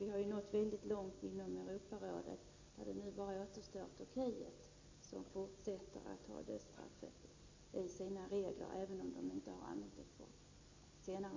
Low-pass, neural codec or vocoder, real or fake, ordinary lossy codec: 7.2 kHz; none; real; AAC, 32 kbps